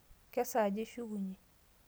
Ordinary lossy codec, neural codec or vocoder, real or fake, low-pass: none; none; real; none